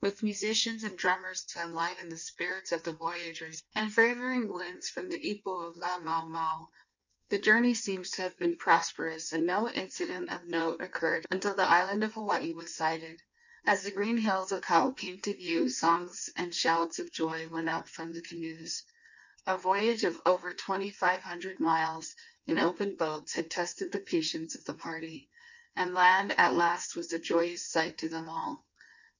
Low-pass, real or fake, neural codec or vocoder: 7.2 kHz; fake; codec, 16 kHz in and 24 kHz out, 1.1 kbps, FireRedTTS-2 codec